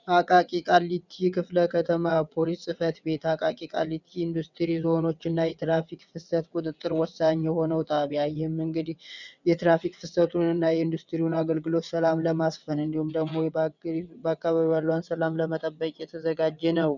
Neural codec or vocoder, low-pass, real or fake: vocoder, 22.05 kHz, 80 mel bands, WaveNeXt; 7.2 kHz; fake